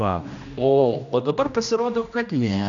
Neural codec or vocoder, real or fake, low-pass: codec, 16 kHz, 1 kbps, X-Codec, HuBERT features, trained on general audio; fake; 7.2 kHz